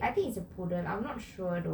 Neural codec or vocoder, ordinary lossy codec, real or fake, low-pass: none; none; real; none